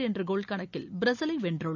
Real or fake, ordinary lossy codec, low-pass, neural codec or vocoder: real; none; 7.2 kHz; none